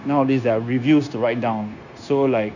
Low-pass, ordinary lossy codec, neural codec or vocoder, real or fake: 7.2 kHz; none; codec, 16 kHz, 0.9 kbps, LongCat-Audio-Codec; fake